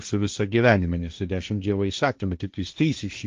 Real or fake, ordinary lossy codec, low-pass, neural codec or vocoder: fake; Opus, 24 kbps; 7.2 kHz; codec, 16 kHz, 1.1 kbps, Voila-Tokenizer